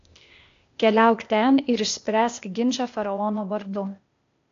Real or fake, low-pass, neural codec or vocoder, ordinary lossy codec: fake; 7.2 kHz; codec, 16 kHz, 0.8 kbps, ZipCodec; AAC, 48 kbps